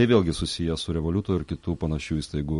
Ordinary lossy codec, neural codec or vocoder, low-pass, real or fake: MP3, 48 kbps; none; 10.8 kHz; real